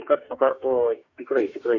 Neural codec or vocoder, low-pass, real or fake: codec, 44.1 kHz, 2.6 kbps, DAC; 7.2 kHz; fake